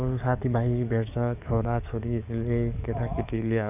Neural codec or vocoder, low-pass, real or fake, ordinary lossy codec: codec, 44.1 kHz, 7.8 kbps, DAC; 3.6 kHz; fake; none